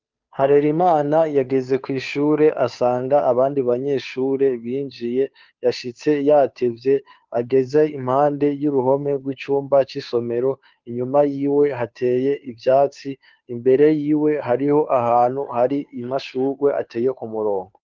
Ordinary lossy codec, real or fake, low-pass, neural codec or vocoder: Opus, 24 kbps; fake; 7.2 kHz; codec, 16 kHz, 2 kbps, FunCodec, trained on Chinese and English, 25 frames a second